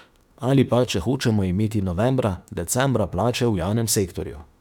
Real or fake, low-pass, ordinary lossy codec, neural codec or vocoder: fake; 19.8 kHz; none; autoencoder, 48 kHz, 32 numbers a frame, DAC-VAE, trained on Japanese speech